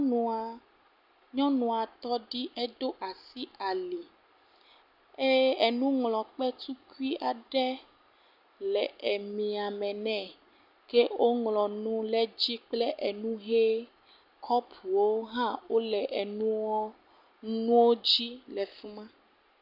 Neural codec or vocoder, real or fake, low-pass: none; real; 5.4 kHz